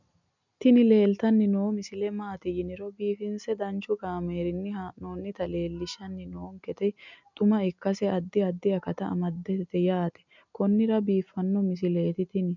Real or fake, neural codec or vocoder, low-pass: real; none; 7.2 kHz